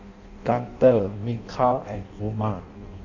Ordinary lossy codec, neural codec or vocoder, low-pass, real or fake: none; codec, 16 kHz in and 24 kHz out, 0.6 kbps, FireRedTTS-2 codec; 7.2 kHz; fake